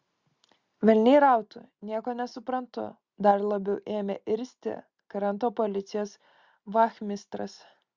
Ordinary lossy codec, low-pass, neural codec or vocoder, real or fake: Opus, 64 kbps; 7.2 kHz; none; real